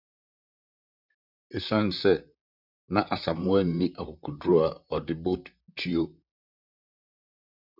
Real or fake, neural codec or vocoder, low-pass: fake; vocoder, 44.1 kHz, 128 mel bands, Pupu-Vocoder; 5.4 kHz